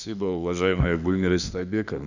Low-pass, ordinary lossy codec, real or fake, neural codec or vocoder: 7.2 kHz; none; fake; autoencoder, 48 kHz, 32 numbers a frame, DAC-VAE, trained on Japanese speech